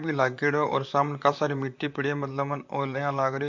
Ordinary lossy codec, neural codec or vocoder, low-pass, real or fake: MP3, 48 kbps; vocoder, 44.1 kHz, 128 mel bands, Pupu-Vocoder; 7.2 kHz; fake